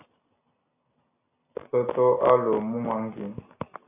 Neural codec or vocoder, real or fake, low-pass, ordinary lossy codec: none; real; 3.6 kHz; AAC, 32 kbps